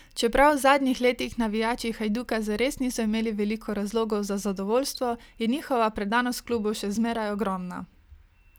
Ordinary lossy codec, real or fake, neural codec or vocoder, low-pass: none; real; none; none